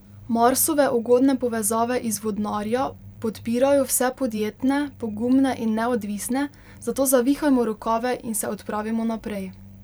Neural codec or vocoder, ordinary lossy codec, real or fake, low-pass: vocoder, 44.1 kHz, 128 mel bands every 512 samples, BigVGAN v2; none; fake; none